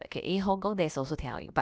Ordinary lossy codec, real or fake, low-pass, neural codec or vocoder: none; fake; none; codec, 16 kHz, about 1 kbps, DyCAST, with the encoder's durations